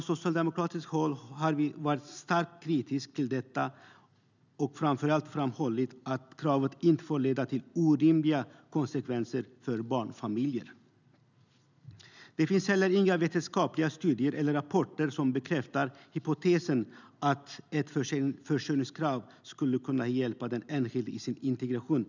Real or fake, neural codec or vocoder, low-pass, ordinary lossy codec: real; none; 7.2 kHz; none